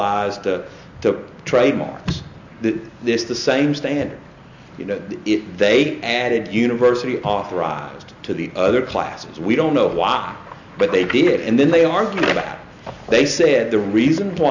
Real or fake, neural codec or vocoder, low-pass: real; none; 7.2 kHz